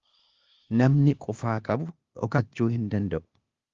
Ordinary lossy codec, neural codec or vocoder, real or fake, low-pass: Opus, 32 kbps; codec, 16 kHz, 0.8 kbps, ZipCodec; fake; 7.2 kHz